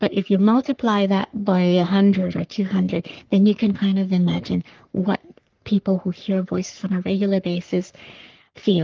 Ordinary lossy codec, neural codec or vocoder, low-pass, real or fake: Opus, 32 kbps; codec, 44.1 kHz, 3.4 kbps, Pupu-Codec; 7.2 kHz; fake